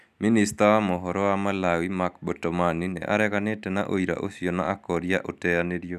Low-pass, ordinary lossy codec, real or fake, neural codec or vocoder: 14.4 kHz; none; real; none